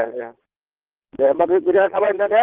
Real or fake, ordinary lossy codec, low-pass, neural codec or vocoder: fake; Opus, 16 kbps; 3.6 kHz; vocoder, 22.05 kHz, 80 mel bands, Vocos